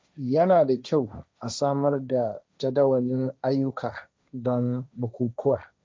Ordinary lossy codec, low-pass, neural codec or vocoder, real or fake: none; none; codec, 16 kHz, 1.1 kbps, Voila-Tokenizer; fake